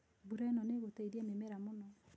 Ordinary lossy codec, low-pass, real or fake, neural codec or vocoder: none; none; real; none